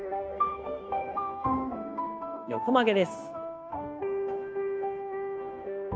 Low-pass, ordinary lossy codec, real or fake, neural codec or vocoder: none; none; fake; codec, 16 kHz, 0.9 kbps, LongCat-Audio-Codec